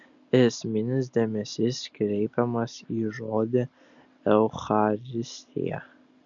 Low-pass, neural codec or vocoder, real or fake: 7.2 kHz; none; real